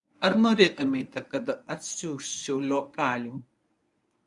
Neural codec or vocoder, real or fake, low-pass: codec, 24 kHz, 0.9 kbps, WavTokenizer, medium speech release version 1; fake; 10.8 kHz